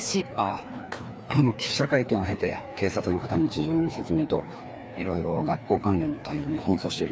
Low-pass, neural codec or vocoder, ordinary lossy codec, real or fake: none; codec, 16 kHz, 2 kbps, FreqCodec, larger model; none; fake